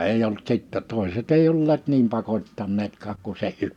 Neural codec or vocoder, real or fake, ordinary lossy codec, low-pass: none; real; none; 19.8 kHz